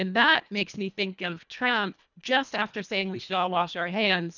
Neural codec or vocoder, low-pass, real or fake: codec, 24 kHz, 1.5 kbps, HILCodec; 7.2 kHz; fake